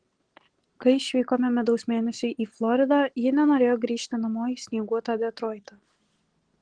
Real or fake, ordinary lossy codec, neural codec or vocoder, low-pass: real; Opus, 16 kbps; none; 9.9 kHz